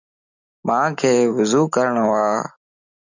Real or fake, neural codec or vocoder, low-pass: real; none; 7.2 kHz